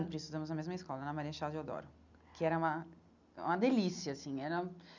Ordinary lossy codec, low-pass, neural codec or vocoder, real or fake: none; 7.2 kHz; none; real